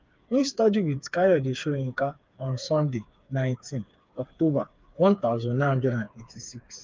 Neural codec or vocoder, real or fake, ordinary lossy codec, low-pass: codec, 16 kHz, 4 kbps, FreqCodec, smaller model; fake; Opus, 24 kbps; 7.2 kHz